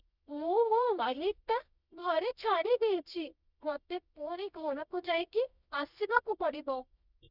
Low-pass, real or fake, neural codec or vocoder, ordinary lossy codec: 5.4 kHz; fake; codec, 24 kHz, 0.9 kbps, WavTokenizer, medium music audio release; none